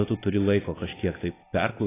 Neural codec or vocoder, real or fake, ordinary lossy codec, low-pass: none; real; AAC, 16 kbps; 3.6 kHz